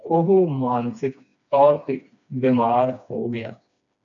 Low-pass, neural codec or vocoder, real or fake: 7.2 kHz; codec, 16 kHz, 2 kbps, FreqCodec, smaller model; fake